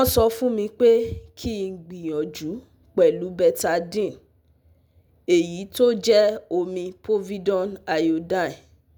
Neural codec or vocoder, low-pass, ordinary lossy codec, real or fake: none; none; none; real